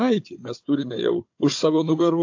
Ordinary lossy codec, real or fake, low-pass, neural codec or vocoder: AAC, 48 kbps; fake; 7.2 kHz; codec, 16 kHz, 4 kbps, FunCodec, trained on Chinese and English, 50 frames a second